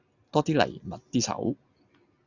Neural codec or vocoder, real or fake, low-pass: none; real; 7.2 kHz